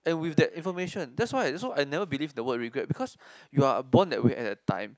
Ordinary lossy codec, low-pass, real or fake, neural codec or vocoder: none; none; real; none